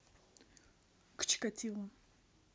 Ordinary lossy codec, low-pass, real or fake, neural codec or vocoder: none; none; real; none